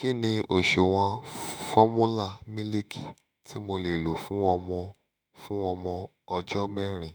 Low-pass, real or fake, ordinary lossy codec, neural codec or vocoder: none; fake; none; autoencoder, 48 kHz, 32 numbers a frame, DAC-VAE, trained on Japanese speech